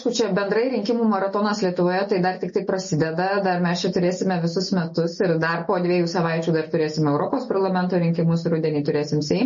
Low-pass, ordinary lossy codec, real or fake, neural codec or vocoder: 7.2 kHz; MP3, 32 kbps; real; none